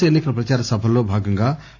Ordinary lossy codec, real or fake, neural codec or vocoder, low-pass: none; real; none; 7.2 kHz